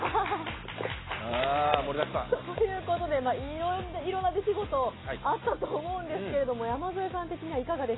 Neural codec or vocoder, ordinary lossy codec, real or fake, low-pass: none; AAC, 16 kbps; real; 7.2 kHz